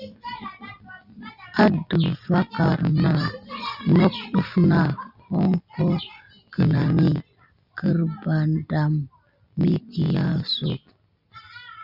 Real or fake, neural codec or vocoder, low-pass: real; none; 5.4 kHz